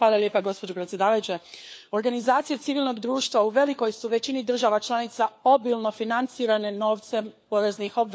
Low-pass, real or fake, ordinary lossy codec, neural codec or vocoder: none; fake; none; codec, 16 kHz, 4 kbps, FunCodec, trained on LibriTTS, 50 frames a second